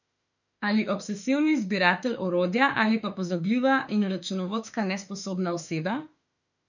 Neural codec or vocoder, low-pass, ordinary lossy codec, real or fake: autoencoder, 48 kHz, 32 numbers a frame, DAC-VAE, trained on Japanese speech; 7.2 kHz; none; fake